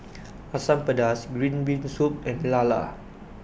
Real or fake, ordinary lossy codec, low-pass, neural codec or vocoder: real; none; none; none